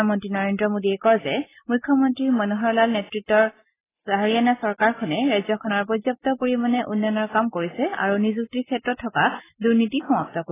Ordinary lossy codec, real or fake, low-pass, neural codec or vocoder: AAC, 16 kbps; real; 3.6 kHz; none